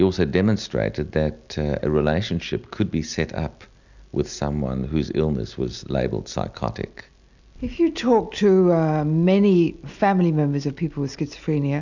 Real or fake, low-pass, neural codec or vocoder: real; 7.2 kHz; none